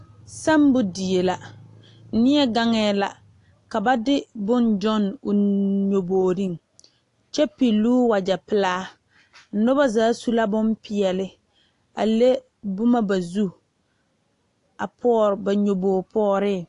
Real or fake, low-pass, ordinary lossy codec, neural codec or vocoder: real; 10.8 kHz; AAC, 48 kbps; none